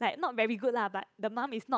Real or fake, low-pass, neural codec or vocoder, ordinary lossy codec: fake; none; codec, 16 kHz, 8 kbps, FunCodec, trained on Chinese and English, 25 frames a second; none